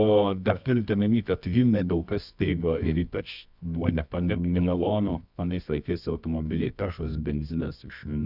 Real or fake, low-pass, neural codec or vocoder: fake; 5.4 kHz; codec, 24 kHz, 0.9 kbps, WavTokenizer, medium music audio release